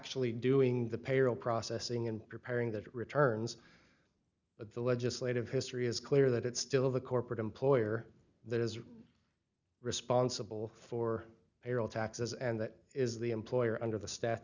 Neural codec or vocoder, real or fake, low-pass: none; real; 7.2 kHz